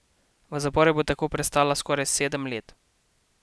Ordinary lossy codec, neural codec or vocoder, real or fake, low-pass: none; none; real; none